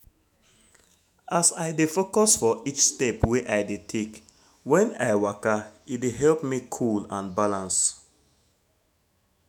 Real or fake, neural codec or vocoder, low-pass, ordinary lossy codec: fake; autoencoder, 48 kHz, 128 numbers a frame, DAC-VAE, trained on Japanese speech; none; none